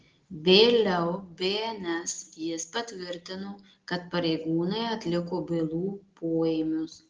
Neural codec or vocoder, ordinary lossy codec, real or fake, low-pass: none; Opus, 16 kbps; real; 7.2 kHz